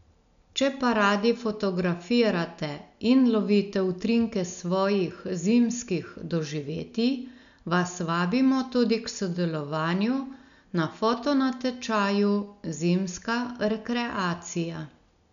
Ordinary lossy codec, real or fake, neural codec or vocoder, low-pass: none; real; none; 7.2 kHz